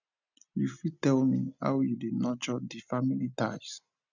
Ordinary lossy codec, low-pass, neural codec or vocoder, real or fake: none; 7.2 kHz; none; real